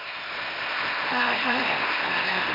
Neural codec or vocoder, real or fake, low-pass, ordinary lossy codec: codec, 24 kHz, 0.9 kbps, WavTokenizer, small release; fake; 5.4 kHz; MP3, 32 kbps